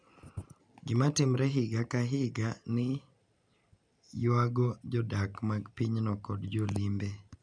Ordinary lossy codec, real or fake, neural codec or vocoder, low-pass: none; real; none; 9.9 kHz